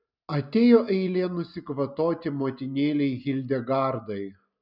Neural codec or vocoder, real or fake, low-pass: none; real; 5.4 kHz